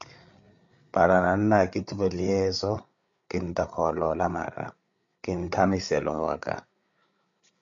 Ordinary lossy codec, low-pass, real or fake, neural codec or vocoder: MP3, 64 kbps; 7.2 kHz; fake; codec, 16 kHz, 8 kbps, FreqCodec, larger model